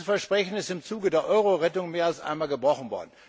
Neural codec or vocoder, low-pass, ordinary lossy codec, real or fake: none; none; none; real